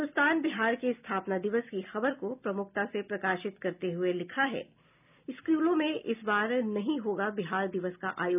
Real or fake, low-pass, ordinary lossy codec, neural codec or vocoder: real; 3.6 kHz; none; none